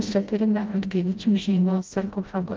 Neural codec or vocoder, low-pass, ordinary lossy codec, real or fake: codec, 16 kHz, 0.5 kbps, FreqCodec, smaller model; 7.2 kHz; Opus, 32 kbps; fake